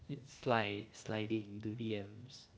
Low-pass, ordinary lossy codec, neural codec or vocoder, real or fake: none; none; codec, 16 kHz, 0.8 kbps, ZipCodec; fake